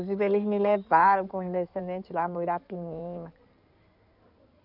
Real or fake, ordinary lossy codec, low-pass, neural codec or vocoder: fake; none; 5.4 kHz; codec, 16 kHz in and 24 kHz out, 2.2 kbps, FireRedTTS-2 codec